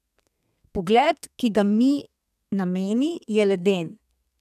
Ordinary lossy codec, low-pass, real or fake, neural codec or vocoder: none; 14.4 kHz; fake; codec, 32 kHz, 1.9 kbps, SNAC